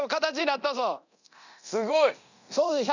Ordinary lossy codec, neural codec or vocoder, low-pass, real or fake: none; codec, 24 kHz, 0.9 kbps, DualCodec; 7.2 kHz; fake